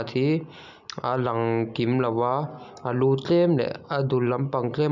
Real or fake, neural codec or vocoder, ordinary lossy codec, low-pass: real; none; none; 7.2 kHz